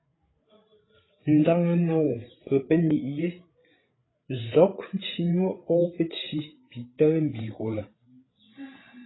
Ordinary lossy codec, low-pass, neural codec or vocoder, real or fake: AAC, 16 kbps; 7.2 kHz; codec, 16 kHz, 8 kbps, FreqCodec, larger model; fake